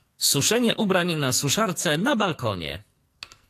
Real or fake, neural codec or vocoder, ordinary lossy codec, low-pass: fake; codec, 44.1 kHz, 2.6 kbps, SNAC; AAC, 64 kbps; 14.4 kHz